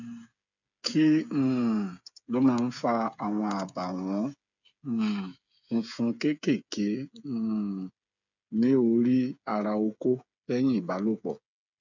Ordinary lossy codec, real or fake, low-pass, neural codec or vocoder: none; fake; 7.2 kHz; codec, 16 kHz, 8 kbps, FreqCodec, smaller model